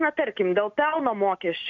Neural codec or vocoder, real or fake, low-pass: none; real; 7.2 kHz